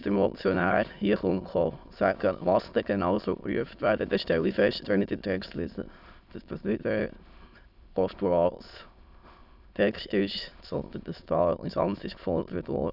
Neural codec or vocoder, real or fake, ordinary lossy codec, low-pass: autoencoder, 22.05 kHz, a latent of 192 numbers a frame, VITS, trained on many speakers; fake; none; 5.4 kHz